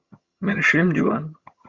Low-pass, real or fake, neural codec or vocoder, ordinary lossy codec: 7.2 kHz; fake; vocoder, 22.05 kHz, 80 mel bands, HiFi-GAN; Opus, 64 kbps